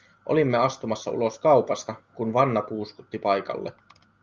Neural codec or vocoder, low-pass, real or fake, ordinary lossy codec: none; 7.2 kHz; real; Opus, 32 kbps